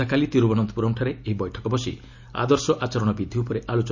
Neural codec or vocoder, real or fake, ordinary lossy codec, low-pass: none; real; none; 7.2 kHz